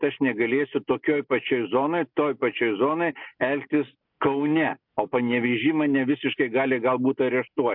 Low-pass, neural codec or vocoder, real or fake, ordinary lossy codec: 5.4 kHz; none; real; MP3, 48 kbps